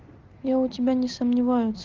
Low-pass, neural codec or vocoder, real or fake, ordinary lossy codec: 7.2 kHz; none; real; Opus, 16 kbps